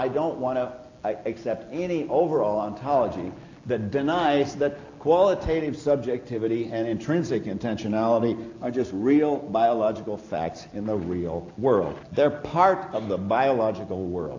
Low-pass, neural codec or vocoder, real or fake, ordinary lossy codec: 7.2 kHz; none; real; AAC, 48 kbps